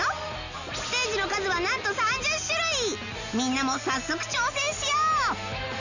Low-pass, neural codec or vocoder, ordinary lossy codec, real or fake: 7.2 kHz; none; none; real